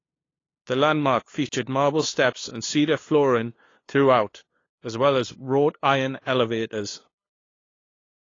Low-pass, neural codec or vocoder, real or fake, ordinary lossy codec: 7.2 kHz; codec, 16 kHz, 2 kbps, FunCodec, trained on LibriTTS, 25 frames a second; fake; AAC, 32 kbps